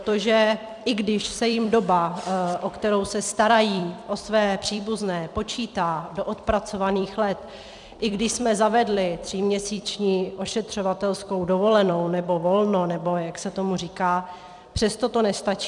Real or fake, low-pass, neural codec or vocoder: fake; 10.8 kHz; vocoder, 44.1 kHz, 128 mel bands every 256 samples, BigVGAN v2